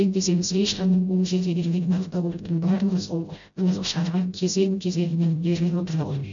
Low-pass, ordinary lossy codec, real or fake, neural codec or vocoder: 7.2 kHz; MP3, 64 kbps; fake; codec, 16 kHz, 0.5 kbps, FreqCodec, smaller model